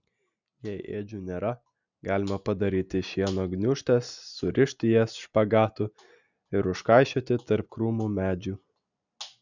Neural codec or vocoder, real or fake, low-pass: none; real; 7.2 kHz